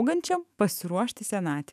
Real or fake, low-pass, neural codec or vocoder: fake; 14.4 kHz; autoencoder, 48 kHz, 128 numbers a frame, DAC-VAE, trained on Japanese speech